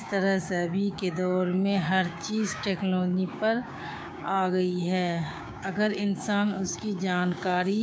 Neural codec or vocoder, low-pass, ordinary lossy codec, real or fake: codec, 16 kHz, 6 kbps, DAC; none; none; fake